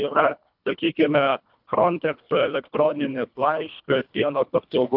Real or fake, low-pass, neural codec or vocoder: fake; 5.4 kHz; codec, 24 kHz, 1.5 kbps, HILCodec